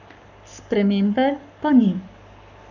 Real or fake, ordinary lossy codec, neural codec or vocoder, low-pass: fake; none; codec, 44.1 kHz, 7.8 kbps, Pupu-Codec; 7.2 kHz